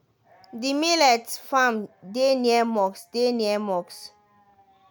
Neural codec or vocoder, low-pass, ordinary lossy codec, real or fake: none; none; none; real